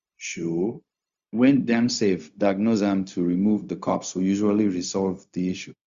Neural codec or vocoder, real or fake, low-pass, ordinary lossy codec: codec, 16 kHz, 0.4 kbps, LongCat-Audio-Codec; fake; 7.2 kHz; Opus, 64 kbps